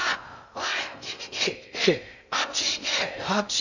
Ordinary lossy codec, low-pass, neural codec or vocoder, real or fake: none; 7.2 kHz; codec, 16 kHz in and 24 kHz out, 0.6 kbps, FocalCodec, streaming, 2048 codes; fake